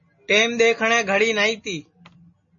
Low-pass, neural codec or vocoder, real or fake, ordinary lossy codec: 7.2 kHz; none; real; MP3, 32 kbps